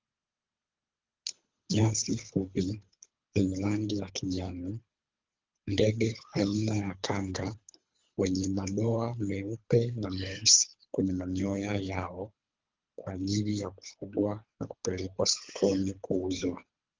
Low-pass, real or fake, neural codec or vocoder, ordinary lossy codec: 7.2 kHz; fake; codec, 24 kHz, 3 kbps, HILCodec; Opus, 32 kbps